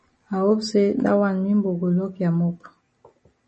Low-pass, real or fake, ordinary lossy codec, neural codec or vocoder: 10.8 kHz; real; MP3, 32 kbps; none